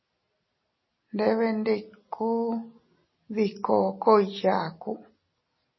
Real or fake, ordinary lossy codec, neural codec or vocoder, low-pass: real; MP3, 24 kbps; none; 7.2 kHz